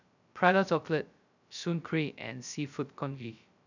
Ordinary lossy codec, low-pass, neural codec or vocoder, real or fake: none; 7.2 kHz; codec, 16 kHz, 0.2 kbps, FocalCodec; fake